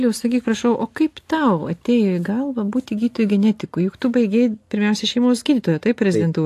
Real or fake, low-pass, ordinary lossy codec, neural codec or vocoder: real; 14.4 kHz; AAC, 64 kbps; none